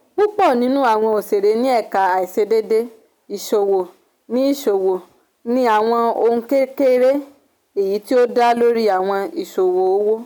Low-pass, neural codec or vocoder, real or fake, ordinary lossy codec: none; none; real; none